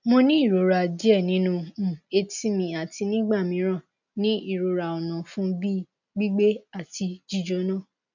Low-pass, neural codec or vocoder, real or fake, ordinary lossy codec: 7.2 kHz; none; real; none